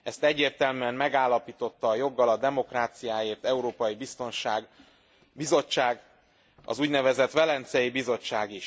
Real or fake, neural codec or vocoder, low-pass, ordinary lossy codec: real; none; none; none